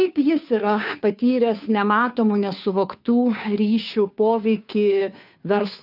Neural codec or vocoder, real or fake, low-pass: codec, 16 kHz, 2 kbps, FunCodec, trained on Chinese and English, 25 frames a second; fake; 5.4 kHz